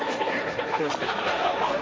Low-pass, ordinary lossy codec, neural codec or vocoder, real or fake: none; none; codec, 16 kHz, 1.1 kbps, Voila-Tokenizer; fake